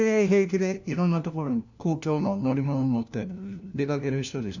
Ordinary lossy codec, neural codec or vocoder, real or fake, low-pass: none; codec, 16 kHz, 1 kbps, FunCodec, trained on LibriTTS, 50 frames a second; fake; 7.2 kHz